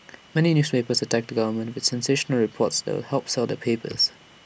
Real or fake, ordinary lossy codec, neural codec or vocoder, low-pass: real; none; none; none